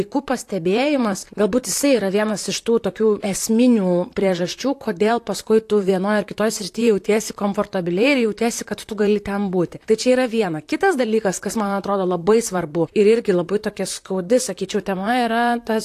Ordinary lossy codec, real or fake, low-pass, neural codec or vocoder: AAC, 64 kbps; fake; 14.4 kHz; vocoder, 44.1 kHz, 128 mel bands, Pupu-Vocoder